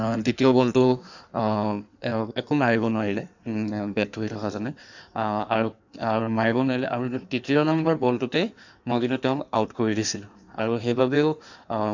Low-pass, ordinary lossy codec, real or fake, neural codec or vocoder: 7.2 kHz; none; fake; codec, 16 kHz in and 24 kHz out, 1.1 kbps, FireRedTTS-2 codec